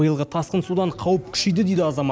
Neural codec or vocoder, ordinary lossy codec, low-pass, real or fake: none; none; none; real